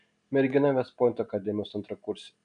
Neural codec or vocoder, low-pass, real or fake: none; 9.9 kHz; real